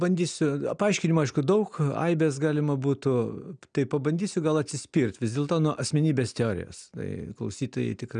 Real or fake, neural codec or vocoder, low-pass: real; none; 9.9 kHz